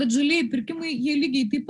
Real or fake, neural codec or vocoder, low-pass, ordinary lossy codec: real; none; 10.8 kHz; Opus, 64 kbps